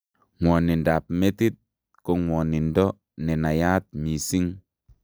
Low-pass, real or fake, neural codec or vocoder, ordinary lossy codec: none; real; none; none